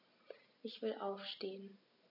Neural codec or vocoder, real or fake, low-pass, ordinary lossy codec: none; real; 5.4 kHz; none